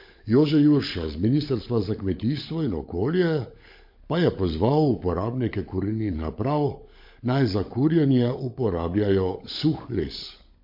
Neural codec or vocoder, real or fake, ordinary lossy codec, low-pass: codec, 16 kHz, 16 kbps, FunCodec, trained on LibriTTS, 50 frames a second; fake; MP3, 32 kbps; 5.4 kHz